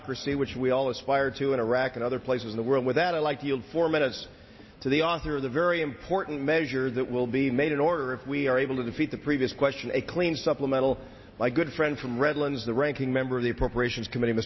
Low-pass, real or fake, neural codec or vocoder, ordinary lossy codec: 7.2 kHz; real; none; MP3, 24 kbps